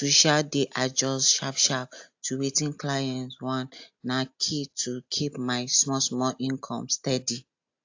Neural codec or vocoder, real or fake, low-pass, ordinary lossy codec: none; real; 7.2 kHz; AAC, 48 kbps